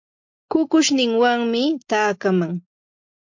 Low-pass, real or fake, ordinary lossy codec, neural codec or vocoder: 7.2 kHz; real; MP3, 48 kbps; none